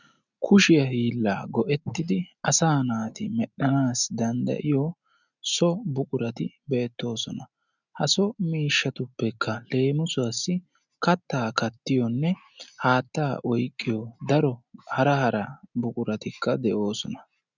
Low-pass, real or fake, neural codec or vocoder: 7.2 kHz; real; none